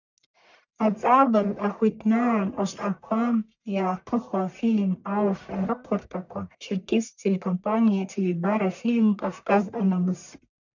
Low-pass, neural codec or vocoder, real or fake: 7.2 kHz; codec, 44.1 kHz, 1.7 kbps, Pupu-Codec; fake